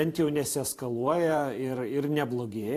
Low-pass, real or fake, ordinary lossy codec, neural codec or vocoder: 14.4 kHz; fake; AAC, 64 kbps; vocoder, 44.1 kHz, 128 mel bands every 512 samples, BigVGAN v2